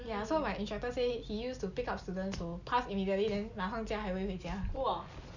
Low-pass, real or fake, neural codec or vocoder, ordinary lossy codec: 7.2 kHz; real; none; none